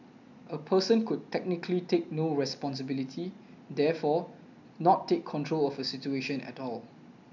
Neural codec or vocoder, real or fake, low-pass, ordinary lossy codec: none; real; 7.2 kHz; none